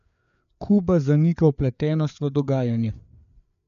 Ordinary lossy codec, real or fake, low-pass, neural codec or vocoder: none; fake; 7.2 kHz; codec, 16 kHz, 4 kbps, FreqCodec, larger model